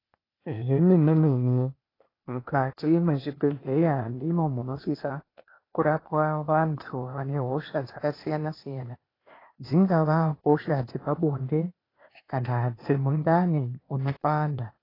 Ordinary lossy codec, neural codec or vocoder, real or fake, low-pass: AAC, 24 kbps; codec, 16 kHz, 0.8 kbps, ZipCodec; fake; 5.4 kHz